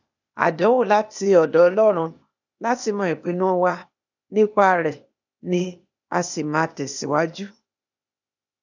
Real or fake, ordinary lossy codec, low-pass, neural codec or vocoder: fake; none; 7.2 kHz; codec, 16 kHz, 0.8 kbps, ZipCodec